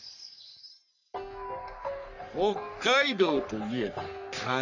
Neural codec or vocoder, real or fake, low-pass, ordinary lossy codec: codec, 44.1 kHz, 3.4 kbps, Pupu-Codec; fake; 7.2 kHz; none